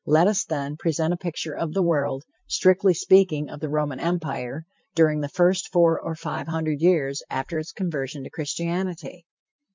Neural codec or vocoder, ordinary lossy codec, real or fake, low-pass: vocoder, 44.1 kHz, 128 mel bands, Pupu-Vocoder; MP3, 64 kbps; fake; 7.2 kHz